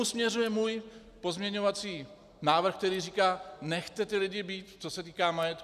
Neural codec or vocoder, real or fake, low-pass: none; real; 14.4 kHz